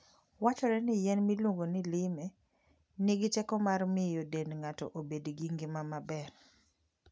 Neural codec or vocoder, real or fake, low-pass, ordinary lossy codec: none; real; none; none